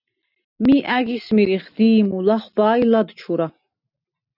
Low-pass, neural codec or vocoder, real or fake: 5.4 kHz; none; real